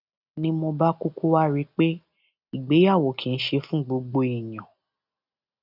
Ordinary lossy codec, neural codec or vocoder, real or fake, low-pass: none; none; real; 5.4 kHz